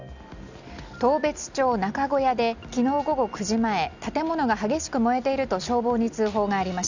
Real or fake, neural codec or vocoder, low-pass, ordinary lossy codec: real; none; 7.2 kHz; Opus, 64 kbps